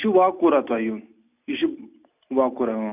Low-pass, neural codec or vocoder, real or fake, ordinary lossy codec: 3.6 kHz; none; real; none